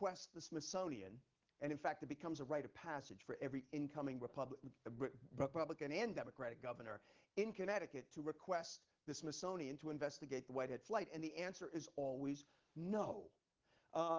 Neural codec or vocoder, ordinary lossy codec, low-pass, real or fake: none; Opus, 16 kbps; 7.2 kHz; real